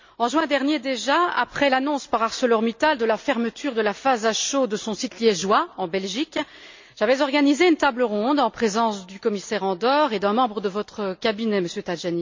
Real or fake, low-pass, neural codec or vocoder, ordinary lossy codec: real; 7.2 kHz; none; MP3, 64 kbps